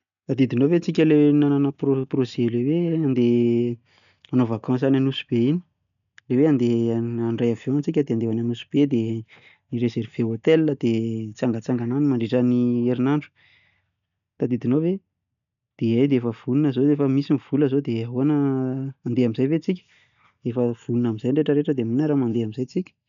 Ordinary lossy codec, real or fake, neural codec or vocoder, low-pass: none; real; none; 7.2 kHz